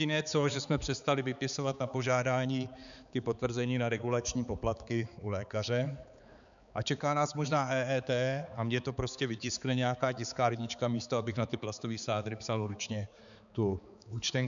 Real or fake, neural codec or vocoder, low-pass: fake; codec, 16 kHz, 4 kbps, X-Codec, HuBERT features, trained on balanced general audio; 7.2 kHz